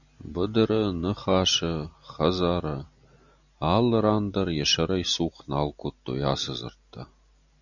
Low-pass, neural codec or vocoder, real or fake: 7.2 kHz; none; real